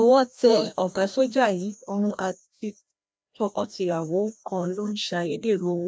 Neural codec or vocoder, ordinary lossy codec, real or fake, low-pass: codec, 16 kHz, 1 kbps, FreqCodec, larger model; none; fake; none